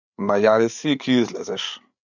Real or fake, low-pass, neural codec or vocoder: fake; 7.2 kHz; codec, 16 kHz, 8 kbps, FreqCodec, larger model